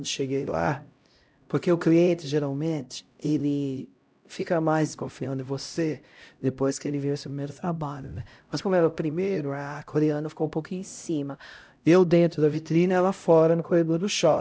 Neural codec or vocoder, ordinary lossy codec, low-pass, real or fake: codec, 16 kHz, 0.5 kbps, X-Codec, HuBERT features, trained on LibriSpeech; none; none; fake